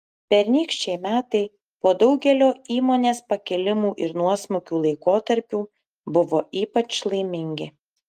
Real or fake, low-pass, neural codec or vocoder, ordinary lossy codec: real; 14.4 kHz; none; Opus, 32 kbps